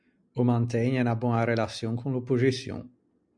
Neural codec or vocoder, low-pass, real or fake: none; 9.9 kHz; real